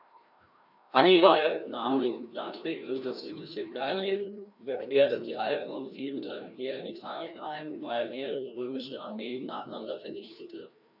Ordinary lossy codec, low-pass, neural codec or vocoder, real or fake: none; 5.4 kHz; codec, 16 kHz, 1 kbps, FreqCodec, larger model; fake